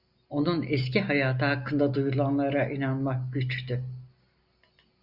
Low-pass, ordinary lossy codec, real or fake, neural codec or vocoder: 5.4 kHz; Opus, 64 kbps; real; none